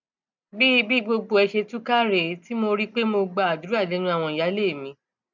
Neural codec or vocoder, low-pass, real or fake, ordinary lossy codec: none; 7.2 kHz; real; none